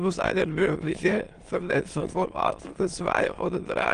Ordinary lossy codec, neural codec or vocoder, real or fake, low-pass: Opus, 24 kbps; autoencoder, 22.05 kHz, a latent of 192 numbers a frame, VITS, trained on many speakers; fake; 9.9 kHz